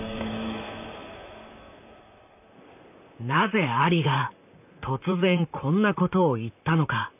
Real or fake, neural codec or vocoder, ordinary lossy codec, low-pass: fake; vocoder, 44.1 kHz, 128 mel bands, Pupu-Vocoder; none; 3.6 kHz